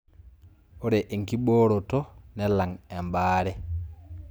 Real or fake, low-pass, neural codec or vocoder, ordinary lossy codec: real; none; none; none